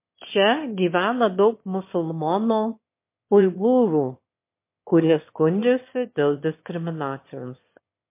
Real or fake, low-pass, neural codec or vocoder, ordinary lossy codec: fake; 3.6 kHz; autoencoder, 22.05 kHz, a latent of 192 numbers a frame, VITS, trained on one speaker; MP3, 24 kbps